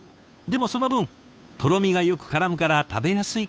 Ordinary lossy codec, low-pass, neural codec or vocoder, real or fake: none; none; codec, 16 kHz, 2 kbps, FunCodec, trained on Chinese and English, 25 frames a second; fake